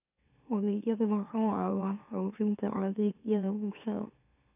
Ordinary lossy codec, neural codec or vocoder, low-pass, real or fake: none; autoencoder, 44.1 kHz, a latent of 192 numbers a frame, MeloTTS; 3.6 kHz; fake